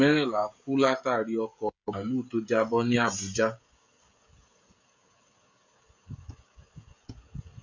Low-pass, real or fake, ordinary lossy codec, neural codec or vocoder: 7.2 kHz; fake; MP3, 64 kbps; codec, 16 kHz, 16 kbps, FreqCodec, smaller model